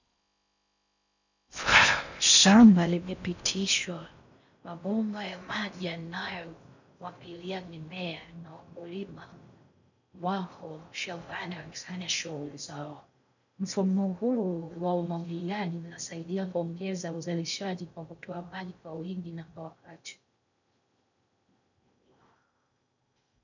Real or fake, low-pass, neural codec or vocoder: fake; 7.2 kHz; codec, 16 kHz in and 24 kHz out, 0.6 kbps, FocalCodec, streaming, 4096 codes